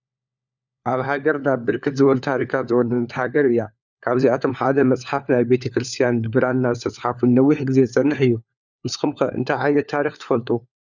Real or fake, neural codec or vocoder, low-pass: fake; codec, 16 kHz, 4 kbps, FunCodec, trained on LibriTTS, 50 frames a second; 7.2 kHz